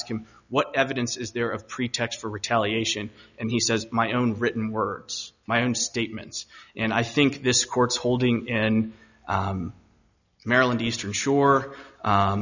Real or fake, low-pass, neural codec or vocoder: real; 7.2 kHz; none